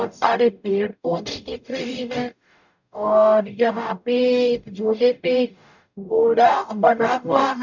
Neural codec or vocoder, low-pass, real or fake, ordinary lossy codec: codec, 44.1 kHz, 0.9 kbps, DAC; 7.2 kHz; fake; none